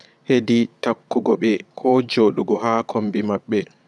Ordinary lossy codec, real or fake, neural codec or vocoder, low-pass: none; fake; vocoder, 22.05 kHz, 80 mel bands, Vocos; none